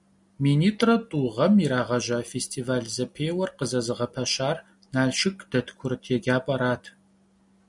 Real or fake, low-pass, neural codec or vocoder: real; 10.8 kHz; none